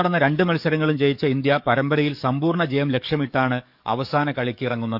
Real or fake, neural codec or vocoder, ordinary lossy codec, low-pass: fake; codec, 44.1 kHz, 7.8 kbps, DAC; none; 5.4 kHz